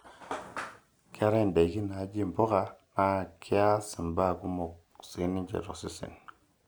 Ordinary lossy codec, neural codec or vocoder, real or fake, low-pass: none; none; real; none